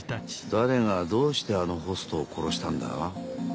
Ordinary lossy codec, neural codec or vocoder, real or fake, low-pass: none; none; real; none